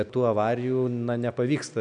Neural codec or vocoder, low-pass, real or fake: none; 9.9 kHz; real